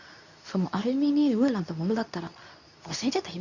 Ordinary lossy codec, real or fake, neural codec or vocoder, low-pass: none; fake; codec, 24 kHz, 0.9 kbps, WavTokenizer, medium speech release version 2; 7.2 kHz